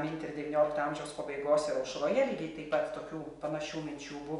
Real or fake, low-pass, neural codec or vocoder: real; 10.8 kHz; none